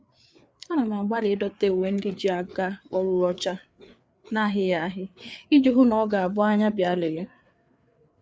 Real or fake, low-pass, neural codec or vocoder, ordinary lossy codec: fake; none; codec, 16 kHz, 4 kbps, FreqCodec, larger model; none